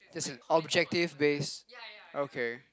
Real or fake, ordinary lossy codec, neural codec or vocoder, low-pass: real; none; none; none